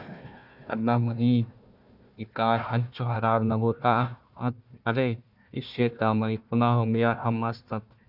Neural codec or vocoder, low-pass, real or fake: codec, 16 kHz, 1 kbps, FunCodec, trained on Chinese and English, 50 frames a second; 5.4 kHz; fake